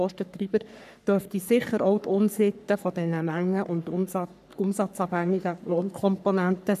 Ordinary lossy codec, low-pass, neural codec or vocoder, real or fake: none; 14.4 kHz; codec, 44.1 kHz, 3.4 kbps, Pupu-Codec; fake